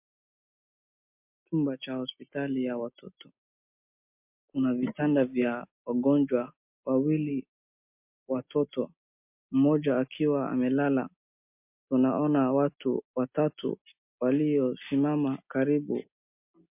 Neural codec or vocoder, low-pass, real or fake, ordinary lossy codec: none; 3.6 kHz; real; MP3, 32 kbps